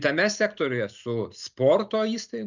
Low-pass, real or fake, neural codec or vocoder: 7.2 kHz; real; none